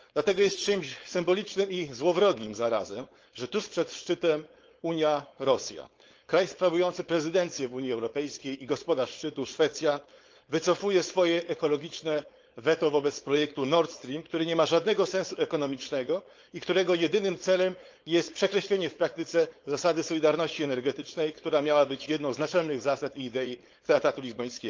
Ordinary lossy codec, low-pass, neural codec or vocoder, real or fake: Opus, 24 kbps; 7.2 kHz; codec, 16 kHz, 4.8 kbps, FACodec; fake